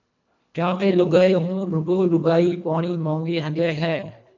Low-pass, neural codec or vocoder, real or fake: 7.2 kHz; codec, 24 kHz, 1.5 kbps, HILCodec; fake